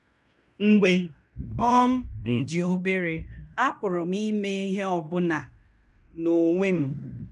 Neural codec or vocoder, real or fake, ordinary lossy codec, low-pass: codec, 16 kHz in and 24 kHz out, 0.9 kbps, LongCat-Audio-Codec, fine tuned four codebook decoder; fake; none; 10.8 kHz